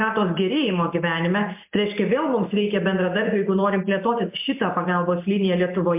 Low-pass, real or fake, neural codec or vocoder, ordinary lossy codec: 3.6 kHz; real; none; MP3, 32 kbps